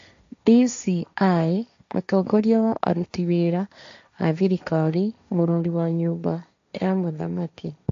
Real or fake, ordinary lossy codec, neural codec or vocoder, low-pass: fake; AAC, 64 kbps; codec, 16 kHz, 1.1 kbps, Voila-Tokenizer; 7.2 kHz